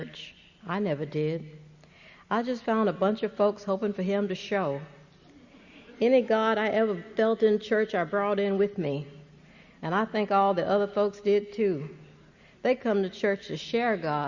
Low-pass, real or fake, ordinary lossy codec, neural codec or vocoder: 7.2 kHz; real; Opus, 64 kbps; none